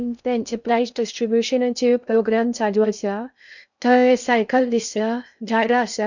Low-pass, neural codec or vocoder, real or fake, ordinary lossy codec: 7.2 kHz; codec, 16 kHz in and 24 kHz out, 0.6 kbps, FocalCodec, streaming, 2048 codes; fake; none